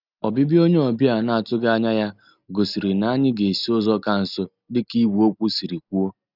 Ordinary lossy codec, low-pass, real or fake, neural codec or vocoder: none; 5.4 kHz; real; none